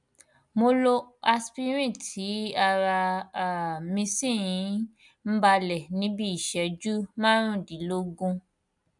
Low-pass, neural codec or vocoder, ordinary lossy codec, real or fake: 10.8 kHz; none; none; real